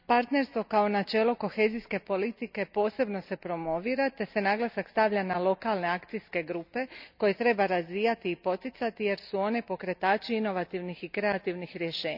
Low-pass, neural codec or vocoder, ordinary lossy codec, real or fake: 5.4 kHz; none; none; real